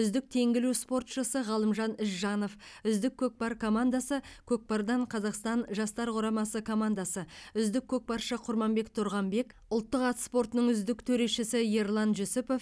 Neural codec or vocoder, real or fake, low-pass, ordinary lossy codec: none; real; none; none